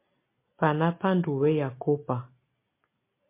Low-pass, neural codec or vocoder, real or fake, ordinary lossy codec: 3.6 kHz; none; real; MP3, 24 kbps